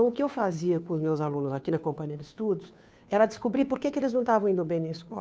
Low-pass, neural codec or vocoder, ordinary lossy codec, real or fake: none; codec, 16 kHz, 2 kbps, FunCodec, trained on Chinese and English, 25 frames a second; none; fake